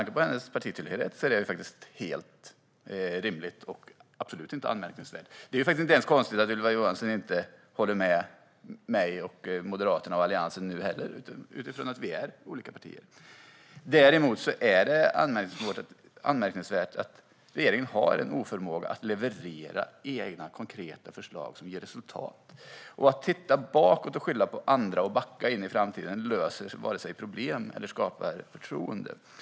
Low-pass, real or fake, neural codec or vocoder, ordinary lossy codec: none; real; none; none